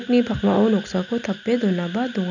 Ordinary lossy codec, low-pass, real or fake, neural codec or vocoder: none; 7.2 kHz; real; none